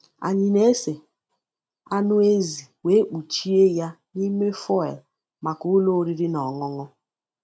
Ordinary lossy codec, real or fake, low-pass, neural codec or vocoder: none; real; none; none